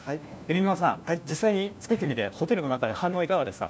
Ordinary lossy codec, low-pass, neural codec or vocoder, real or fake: none; none; codec, 16 kHz, 1 kbps, FunCodec, trained on LibriTTS, 50 frames a second; fake